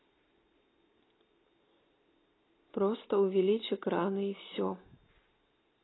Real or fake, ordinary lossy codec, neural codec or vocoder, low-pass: real; AAC, 16 kbps; none; 7.2 kHz